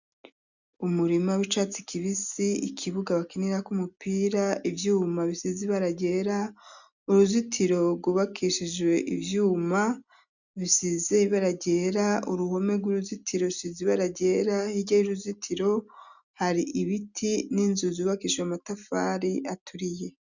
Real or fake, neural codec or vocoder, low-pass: real; none; 7.2 kHz